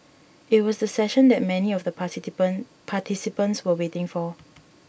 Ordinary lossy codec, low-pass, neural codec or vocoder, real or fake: none; none; none; real